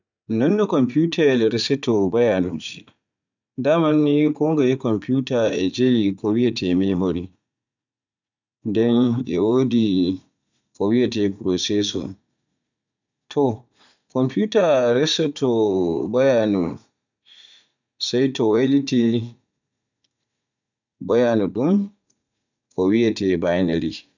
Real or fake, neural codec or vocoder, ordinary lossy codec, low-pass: fake; vocoder, 44.1 kHz, 80 mel bands, Vocos; none; 7.2 kHz